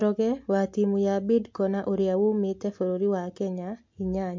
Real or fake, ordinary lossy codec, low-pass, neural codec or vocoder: real; MP3, 48 kbps; 7.2 kHz; none